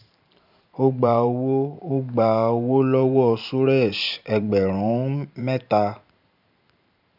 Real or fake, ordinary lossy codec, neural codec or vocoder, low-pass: fake; none; vocoder, 44.1 kHz, 128 mel bands every 512 samples, BigVGAN v2; 5.4 kHz